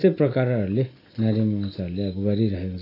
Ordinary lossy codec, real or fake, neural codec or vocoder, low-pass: AAC, 24 kbps; real; none; 5.4 kHz